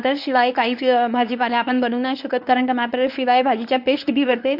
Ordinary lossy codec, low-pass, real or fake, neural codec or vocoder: AAC, 48 kbps; 5.4 kHz; fake; codec, 24 kHz, 0.9 kbps, WavTokenizer, medium speech release version 1